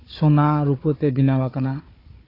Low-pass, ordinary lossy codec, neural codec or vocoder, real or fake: 5.4 kHz; AAC, 24 kbps; codec, 16 kHz, 4 kbps, FunCodec, trained on Chinese and English, 50 frames a second; fake